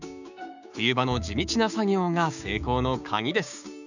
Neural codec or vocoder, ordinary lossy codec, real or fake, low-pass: codec, 16 kHz, 6 kbps, DAC; none; fake; 7.2 kHz